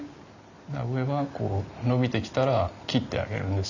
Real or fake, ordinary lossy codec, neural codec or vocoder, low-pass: real; none; none; 7.2 kHz